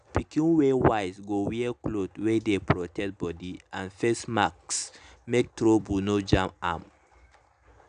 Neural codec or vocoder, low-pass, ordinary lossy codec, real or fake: none; 9.9 kHz; none; real